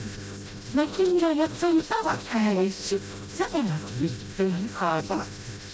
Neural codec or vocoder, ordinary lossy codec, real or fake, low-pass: codec, 16 kHz, 0.5 kbps, FreqCodec, smaller model; none; fake; none